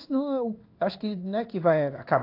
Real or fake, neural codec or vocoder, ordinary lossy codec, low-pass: fake; codec, 16 kHz in and 24 kHz out, 1 kbps, XY-Tokenizer; AAC, 32 kbps; 5.4 kHz